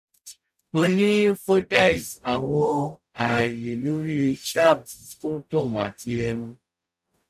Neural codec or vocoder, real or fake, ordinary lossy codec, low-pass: codec, 44.1 kHz, 0.9 kbps, DAC; fake; none; 14.4 kHz